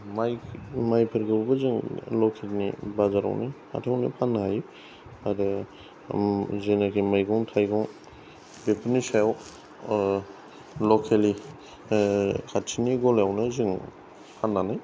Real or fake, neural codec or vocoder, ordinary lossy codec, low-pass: real; none; none; none